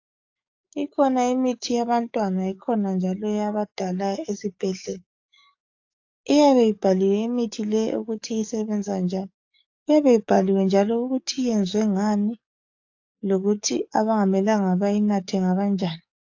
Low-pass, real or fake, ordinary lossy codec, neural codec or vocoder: 7.2 kHz; fake; AAC, 48 kbps; codec, 44.1 kHz, 7.8 kbps, DAC